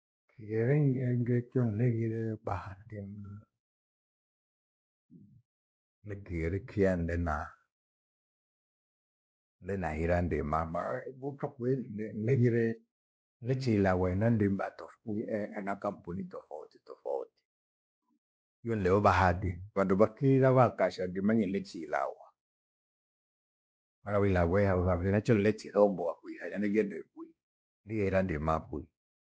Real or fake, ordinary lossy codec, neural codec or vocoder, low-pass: fake; none; codec, 16 kHz, 2 kbps, X-Codec, WavLM features, trained on Multilingual LibriSpeech; none